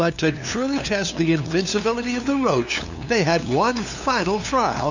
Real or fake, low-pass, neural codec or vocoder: fake; 7.2 kHz; codec, 16 kHz, 2 kbps, FunCodec, trained on LibriTTS, 25 frames a second